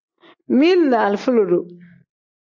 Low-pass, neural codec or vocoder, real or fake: 7.2 kHz; none; real